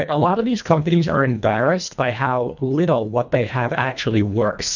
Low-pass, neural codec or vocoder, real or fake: 7.2 kHz; codec, 24 kHz, 1.5 kbps, HILCodec; fake